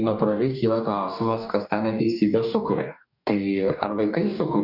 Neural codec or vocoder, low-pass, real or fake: codec, 44.1 kHz, 2.6 kbps, DAC; 5.4 kHz; fake